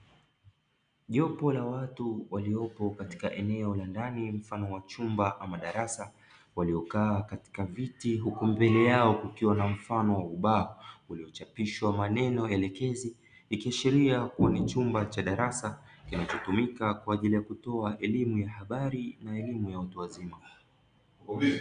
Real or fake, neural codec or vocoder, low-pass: real; none; 9.9 kHz